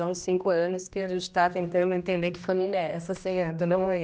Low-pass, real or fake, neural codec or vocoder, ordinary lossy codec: none; fake; codec, 16 kHz, 1 kbps, X-Codec, HuBERT features, trained on general audio; none